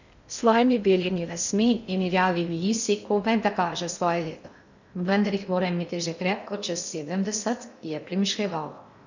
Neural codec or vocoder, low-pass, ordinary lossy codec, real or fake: codec, 16 kHz in and 24 kHz out, 0.6 kbps, FocalCodec, streaming, 2048 codes; 7.2 kHz; none; fake